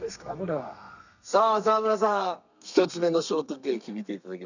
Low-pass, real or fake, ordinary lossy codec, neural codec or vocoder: 7.2 kHz; fake; none; codec, 32 kHz, 1.9 kbps, SNAC